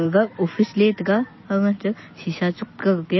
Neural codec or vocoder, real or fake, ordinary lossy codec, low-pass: none; real; MP3, 24 kbps; 7.2 kHz